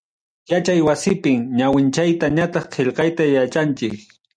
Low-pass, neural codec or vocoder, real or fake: 9.9 kHz; none; real